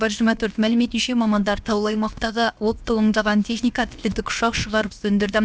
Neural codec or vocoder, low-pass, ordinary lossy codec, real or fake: codec, 16 kHz, about 1 kbps, DyCAST, with the encoder's durations; none; none; fake